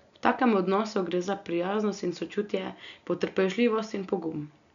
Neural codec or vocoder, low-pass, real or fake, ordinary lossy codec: none; 7.2 kHz; real; none